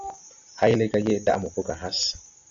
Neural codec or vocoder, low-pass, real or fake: none; 7.2 kHz; real